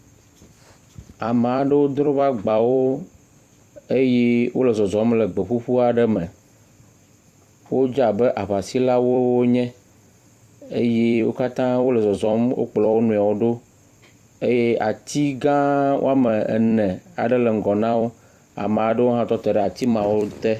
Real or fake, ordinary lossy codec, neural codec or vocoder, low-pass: fake; Opus, 64 kbps; vocoder, 44.1 kHz, 128 mel bands every 256 samples, BigVGAN v2; 14.4 kHz